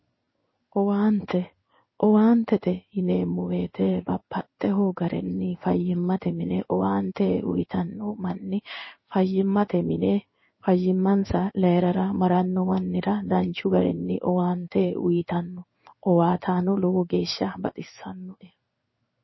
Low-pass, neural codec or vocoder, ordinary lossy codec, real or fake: 7.2 kHz; codec, 16 kHz in and 24 kHz out, 1 kbps, XY-Tokenizer; MP3, 24 kbps; fake